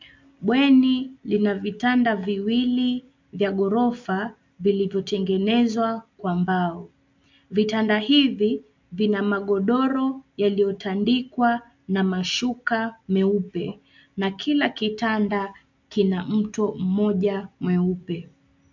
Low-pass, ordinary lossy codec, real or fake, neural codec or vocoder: 7.2 kHz; MP3, 64 kbps; real; none